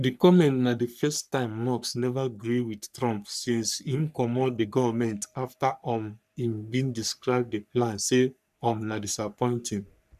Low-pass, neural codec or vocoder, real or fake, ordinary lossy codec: 14.4 kHz; codec, 44.1 kHz, 3.4 kbps, Pupu-Codec; fake; none